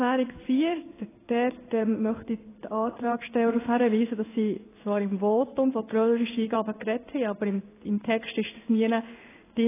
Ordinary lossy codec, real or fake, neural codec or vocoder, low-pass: AAC, 16 kbps; fake; codec, 16 kHz in and 24 kHz out, 1 kbps, XY-Tokenizer; 3.6 kHz